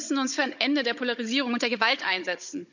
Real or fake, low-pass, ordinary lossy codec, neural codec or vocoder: fake; 7.2 kHz; none; codec, 16 kHz, 16 kbps, FunCodec, trained on Chinese and English, 50 frames a second